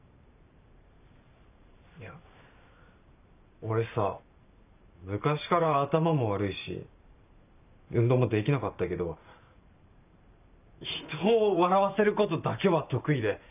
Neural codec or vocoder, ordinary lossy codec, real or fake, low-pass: vocoder, 44.1 kHz, 128 mel bands every 512 samples, BigVGAN v2; none; fake; 3.6 kHz